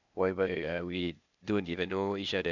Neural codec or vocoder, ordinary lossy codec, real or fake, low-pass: codec, 16 kHz, 0.8 kbps, ZipCodec; none; fake; 7.2 kHz